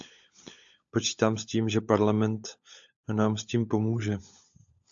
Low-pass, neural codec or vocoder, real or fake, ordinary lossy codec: 7.2 kHz; codec, 16 kHz, 16 kbps, FunCodec, trained on LibriTTS, 50 frames a second; fake; AAC, 64 kbps